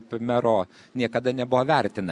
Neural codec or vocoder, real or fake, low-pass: none; real; 10.8 kHz